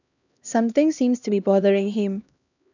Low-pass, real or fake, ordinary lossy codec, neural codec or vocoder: 7.2 kHz; fake; none; codec, 16 kHz, 1 kbps, X-Codec, HuBERT features, trained on LibriSpeech